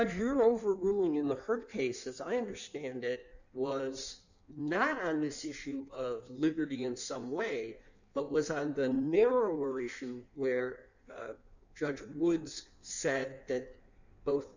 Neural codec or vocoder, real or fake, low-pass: codec, 16 kHz in and 24 kHz out, 1.1 kbps, FireRedTTS-2 codec; fake; 7.2 kHz